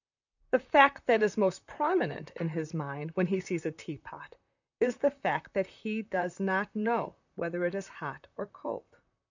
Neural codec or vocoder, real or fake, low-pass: vocoder, 44.1 kHz, 128 mel bands, Pupu-Vocoder; fake; 7.2 kHz